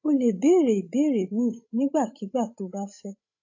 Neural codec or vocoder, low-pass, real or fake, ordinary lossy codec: codec, 16 kHz, 16 kbps, FreqCodec, larger model; 7.2 kHz; fake; AAC, 48 kbps